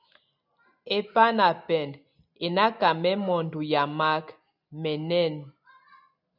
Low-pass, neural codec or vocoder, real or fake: 5.4 kHz; none; real